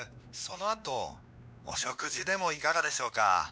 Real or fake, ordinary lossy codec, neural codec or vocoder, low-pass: fake; none; codec, 16 kHz, 2 kbps, X-Codec, WavLM features, trained on Multilingual LibriSpeech; none